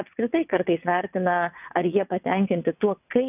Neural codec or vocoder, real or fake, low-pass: vocoder, 22.05 kHz, 80 mel bands, WaveNeXt; fake; 3.6 kHz